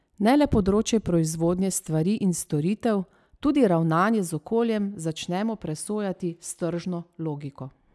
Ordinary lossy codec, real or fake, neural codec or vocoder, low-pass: none; real; none; none